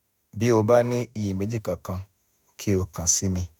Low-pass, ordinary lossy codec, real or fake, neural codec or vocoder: none; none; fake; autoencoder, 48 kHz, 32 numbers a frame, DAC-VAE, trained on Japanese speech